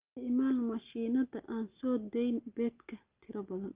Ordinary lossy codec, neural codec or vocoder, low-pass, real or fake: Opus, 24 kbps; none; 3.6 kHz; real